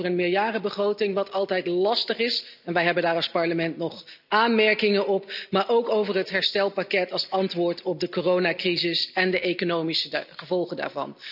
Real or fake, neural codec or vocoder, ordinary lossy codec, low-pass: real; none; none; 5.4 kHz